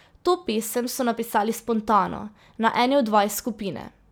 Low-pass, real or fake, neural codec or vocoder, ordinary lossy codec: none; real; none; none